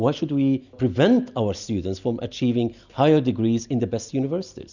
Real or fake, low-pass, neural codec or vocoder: real; 7.2 kHz; none